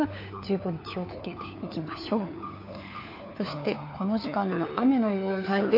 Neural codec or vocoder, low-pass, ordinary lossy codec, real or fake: codec, 16 kHz, 4 kbps, FunCodec, trained on LibriTTS, 50 frames a second; 5.4 kHz; AAC, 32 kbps; fake